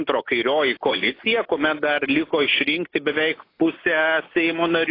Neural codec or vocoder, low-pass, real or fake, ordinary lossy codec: none; 5.4 kHz; real; AAC, 24 kbps